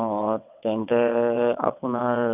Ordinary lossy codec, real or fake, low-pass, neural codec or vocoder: none; fake; 3.6 kHz; vocoder, 22.05 kHz, 80 mel bands, WaveNeXt